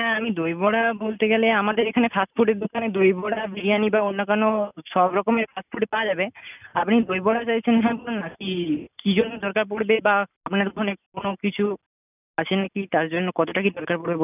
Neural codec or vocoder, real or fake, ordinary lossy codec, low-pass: none; real; none; 3.6 kHz